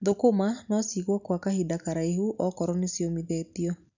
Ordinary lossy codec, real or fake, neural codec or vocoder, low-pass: none; real; none; 7.2 kHz